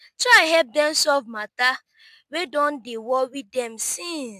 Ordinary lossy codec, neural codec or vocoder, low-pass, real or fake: MP3, 96 kbps; none; 14.4 kHz; real